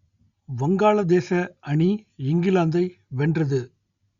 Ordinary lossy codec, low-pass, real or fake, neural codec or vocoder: Opus, 64 kbps; 7.2 kHz; real; none